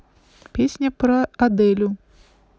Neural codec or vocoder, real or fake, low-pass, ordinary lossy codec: none; real; none; none